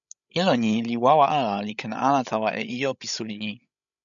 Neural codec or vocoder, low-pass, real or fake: codec, 16 kHz, 16 kbps, FreqCodec, larger model; 7.2 kHz; fake